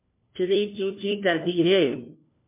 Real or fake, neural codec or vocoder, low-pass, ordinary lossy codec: fake; codec, 16 kHz, 1 kbps, FunCodec, trained on LibriTTS, 50 frames a second; 3.6 kHz; MP3, 24 kbps